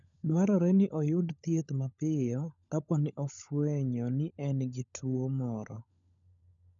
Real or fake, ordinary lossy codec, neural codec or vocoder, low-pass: fake; none; codec, 16 kHz, 16 kbps, FunCodec, trained on LibriTTS, 50 frames a second; 7.2 kHz